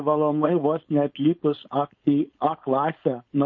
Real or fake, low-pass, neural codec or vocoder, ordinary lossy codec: fake; 7.2 kHz; codec, 16 kHz, 4.8 kbps, FACodec; MP3, 32 kbps